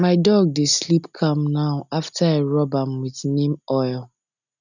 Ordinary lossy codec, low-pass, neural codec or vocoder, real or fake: none; 7.2 kHz; none; real